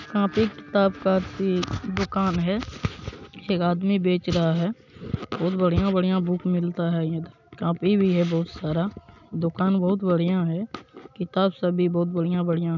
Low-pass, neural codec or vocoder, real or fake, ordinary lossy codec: 7.2 kHz; none; real; none